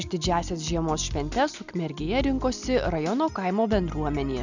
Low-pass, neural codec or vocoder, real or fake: 7.2 kHz; none; real